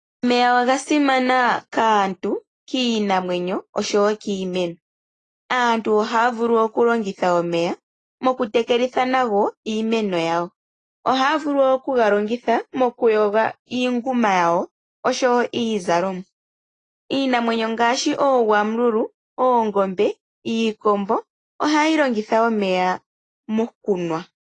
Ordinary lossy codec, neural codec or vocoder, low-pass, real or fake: AAC, 32 kbps; none; 9.9 kHz; real